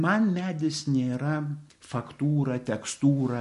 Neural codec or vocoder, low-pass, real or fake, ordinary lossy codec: none; 14.4 kHz; real; MP3, 48 kbps